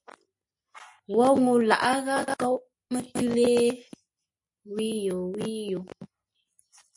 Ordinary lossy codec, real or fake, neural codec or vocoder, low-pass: MP3, 48 kbps; real; none; 10.8 kHz